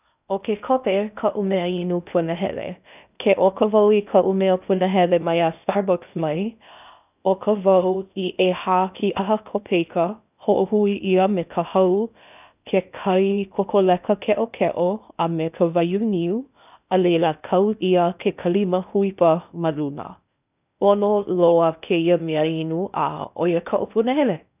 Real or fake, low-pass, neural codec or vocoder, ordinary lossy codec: fake; 3.6 kHz; codec, 16 kHz in and 24 kHz out, 0.6 kbps, FocalCodec, streaming, 2048 codes; none